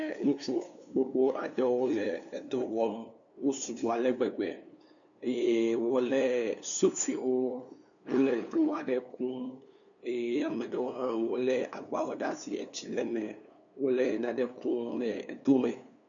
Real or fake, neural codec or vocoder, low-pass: fake; codec, 16 kHz, 2 kbps, FunCodec, trained on LibriTTS, 25 frames a second; 7.2 kHz